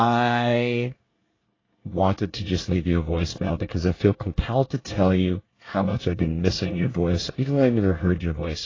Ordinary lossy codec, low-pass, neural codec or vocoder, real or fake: AAC, 32 kbps; 7.2 kHz; codec, 24 kHz, 1 kbps, SNAC; fake